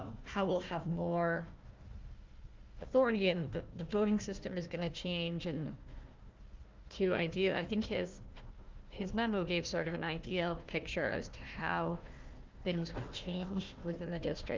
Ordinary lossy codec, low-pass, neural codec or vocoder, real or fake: Opus, 24 kbps; 7.2 kHz; codec, 16 kHz, 1 kbps, FunCodec, trained on Chinese and English, 50 frames a second; fake